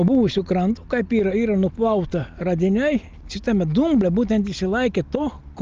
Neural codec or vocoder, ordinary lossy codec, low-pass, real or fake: none; Opus, 24 kbps; 7.2 kHz; real